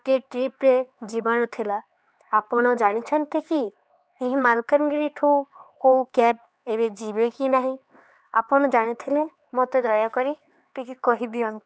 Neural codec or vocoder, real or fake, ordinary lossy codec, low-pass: codec, 16 kHz, 4 kbps, X-Codec, HuBERT features, trained on LibriSpeech; fake; none; none